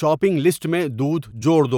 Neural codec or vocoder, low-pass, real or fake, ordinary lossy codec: vocoder, 44.1 kHz, 128 mel bands every 256 samples, BigVGAN v2; 19.8 kHz; fake; none